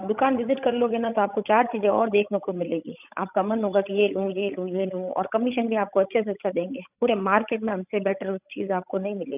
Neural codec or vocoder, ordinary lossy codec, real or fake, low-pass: codec, 16 kHz, 16 kbps, FreqCodec, larger model; none; fake; 3.6 kHz